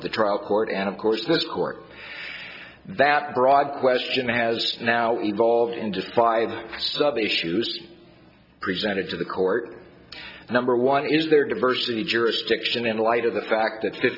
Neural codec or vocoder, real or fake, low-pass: none; real; 5.4 kHz